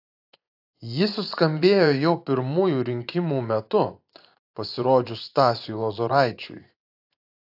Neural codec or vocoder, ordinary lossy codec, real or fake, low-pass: vocoder, 24 kHz, 100 mel bands, Vocos; AAC, 48 kbps; fake; 5.4 kHz